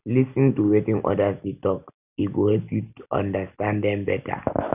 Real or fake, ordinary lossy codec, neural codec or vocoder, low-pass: real; none; none; 3.6 kHz